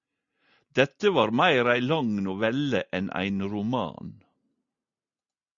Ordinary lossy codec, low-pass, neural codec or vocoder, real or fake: Opus, 64 kbps; 7.2 kHz; none; real